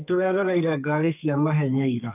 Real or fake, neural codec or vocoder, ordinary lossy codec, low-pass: fake; codec, 32 kHz, 1.9 kbps, SNAC; none; 3.6 kHz